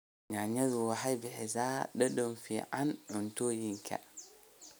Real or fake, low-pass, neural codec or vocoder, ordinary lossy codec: real; none; none; none